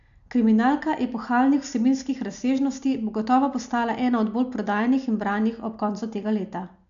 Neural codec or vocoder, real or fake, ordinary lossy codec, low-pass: none; real; none; 7.2 kHz